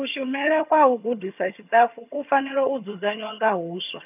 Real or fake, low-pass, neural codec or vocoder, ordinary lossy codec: fake; 3.6 kHz; vocoder, 22.05 kHz, 80 mel bands, HiFi-GAN; none